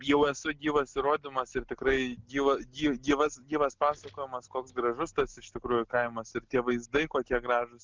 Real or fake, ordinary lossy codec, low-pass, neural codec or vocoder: real; Opus, 32 kbps; 7.2 kHz; none